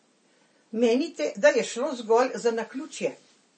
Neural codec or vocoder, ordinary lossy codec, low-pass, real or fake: none; MP3, 32 kbps; 10.8 kHz; real